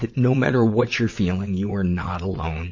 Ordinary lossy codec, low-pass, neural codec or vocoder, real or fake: MP3, 32 kbps; 7.2 kHz; vocoder, 22.05 kHz, 80 mel bands, WaveNeXt; fake